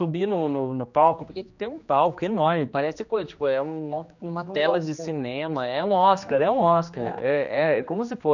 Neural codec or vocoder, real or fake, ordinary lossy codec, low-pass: codec, 16 kHz, 2 kbps, X-Codec, HuBERT features, trained on general audio; fake; none; 7.2 kHz